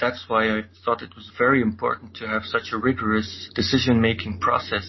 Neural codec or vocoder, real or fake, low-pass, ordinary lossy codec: none; real; 7.2 kHz; MP3, 24 kbps